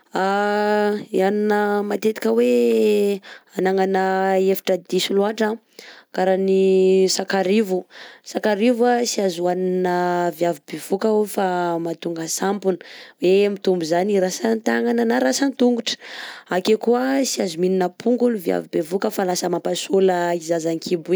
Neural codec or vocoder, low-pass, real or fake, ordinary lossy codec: none; none; real; none